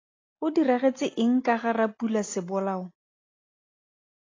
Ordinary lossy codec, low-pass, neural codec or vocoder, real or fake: AAC, 32 kbps; 7.2 kHz; none; real